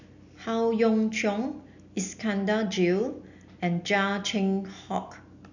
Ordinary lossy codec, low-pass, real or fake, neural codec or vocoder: none; 7.2 kHz; real; none